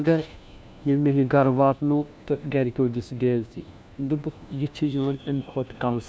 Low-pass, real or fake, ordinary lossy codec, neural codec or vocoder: none; fake; none; codec, 16 kHz, 1 kbps, FunCodec, trained on LibriTTS, 50 frames a second